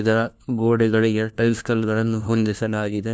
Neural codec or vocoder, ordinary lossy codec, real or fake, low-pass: codec, 16 kHz, 1 kbps, FunCodec, trained on LibriTTS, 50 frames a second; none; fake; none